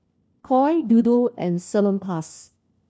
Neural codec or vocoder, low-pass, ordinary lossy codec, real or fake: codec, 16 kHz, 1 kbps, FunCodec, trained on LibriTTS, 50 frames a second; none; none; fake